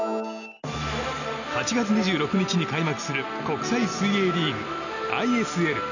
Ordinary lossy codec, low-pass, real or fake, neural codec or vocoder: none; 7.2 kHz; real; none